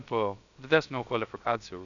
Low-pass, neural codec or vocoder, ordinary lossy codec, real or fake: 7.2 kHz; codec, 16 kHz, about 1 kbps, DyCAST, with the encoder's durations; MP3, 96 kbps; fake